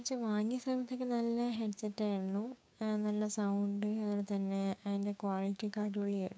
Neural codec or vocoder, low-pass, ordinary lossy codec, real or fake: codec, 16 kHz, 6 kbps, DAC; none; none; fake